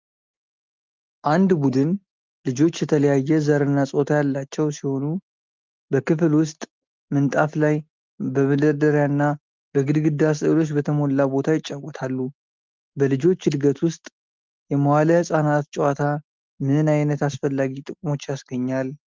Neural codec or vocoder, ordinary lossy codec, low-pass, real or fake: none; Opus, 24 kbps; 7.2 kHz; real